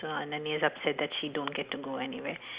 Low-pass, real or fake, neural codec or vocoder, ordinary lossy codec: 3.6 kHz; real; none; Opus, 64 kbps